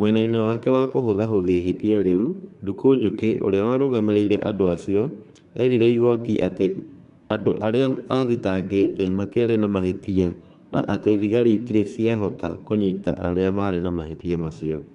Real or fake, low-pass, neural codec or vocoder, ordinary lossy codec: fake; 10.8 kHz; codec, 24 kHz, 1 kbps, SNAC; none